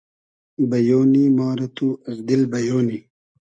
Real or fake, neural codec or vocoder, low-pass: real; none; 9.9 kHz